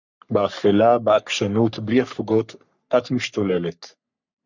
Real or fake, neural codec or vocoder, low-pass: fake; codec, 44.1 kHz, 3.4 kbps, Pupu-Codec; 7.2 kHz